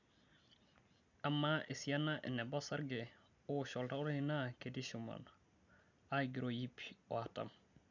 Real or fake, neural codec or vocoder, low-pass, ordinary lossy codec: real; none; 7.2 kHz; none